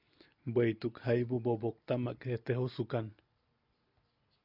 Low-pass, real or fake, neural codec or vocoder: 5.4 kHz; real; none